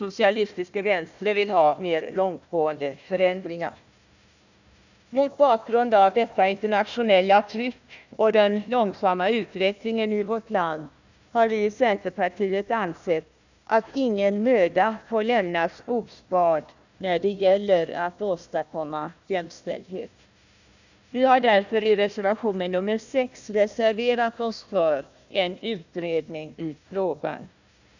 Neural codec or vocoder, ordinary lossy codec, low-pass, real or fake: codec, 16 kHz, 1 kbps, FunCodec, trained on Chinese and English, 50 frames a second; none; 7.2 kHz; fake